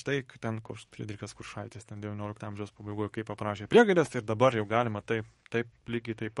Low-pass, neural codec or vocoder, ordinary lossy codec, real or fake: 19.8 kHz; codec, 44.1 kHz, 7.8 kbps, DAC; MP3, 48 kbps; fake